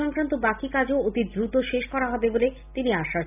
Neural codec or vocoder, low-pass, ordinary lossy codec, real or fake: none; 3.6 kHz; none; real